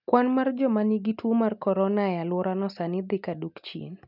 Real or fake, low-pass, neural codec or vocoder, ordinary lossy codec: real; 5.4 kHz; none; none